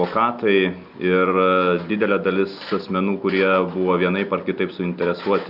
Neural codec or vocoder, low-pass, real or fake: none; 5.4 kHz; real